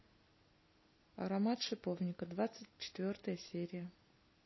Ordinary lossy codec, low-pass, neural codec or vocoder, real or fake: MP3, 24 kbps; 7.2 kHz; none; real